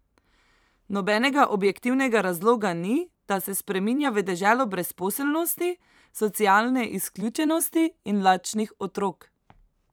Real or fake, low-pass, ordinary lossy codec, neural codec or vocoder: fake; none; none; vocoder, 44.1 kHz, 128 mel bands every 512 samples, BigVGAN v2